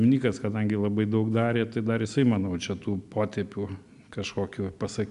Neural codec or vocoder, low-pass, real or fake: none; 10.8 kHz; real